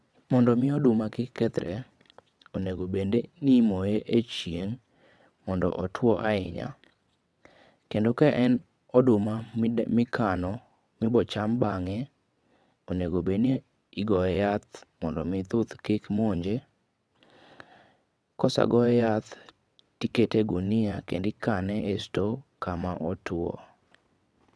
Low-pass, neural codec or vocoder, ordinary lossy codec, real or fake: none; vocoder, 22.05 kHz, 80 mel bands, WaveNeXt; none; fake